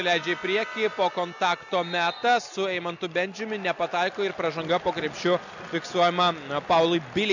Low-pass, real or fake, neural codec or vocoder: 7.2 kHz; real; none